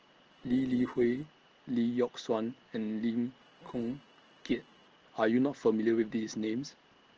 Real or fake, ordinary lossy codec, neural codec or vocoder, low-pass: real; Opus, 16 kbps; none; 7.2 kHz